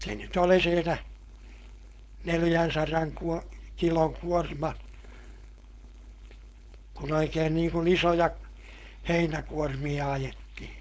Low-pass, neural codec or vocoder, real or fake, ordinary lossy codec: none; codec, 16 kHz, 4.8 kbps, FACodec; fake; none